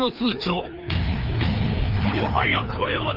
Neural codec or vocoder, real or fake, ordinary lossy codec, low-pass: codec, 16 kHz, 2 kbps, FreqCodec, larger model; fake; Opus, 24 kbps; 5.4 kHz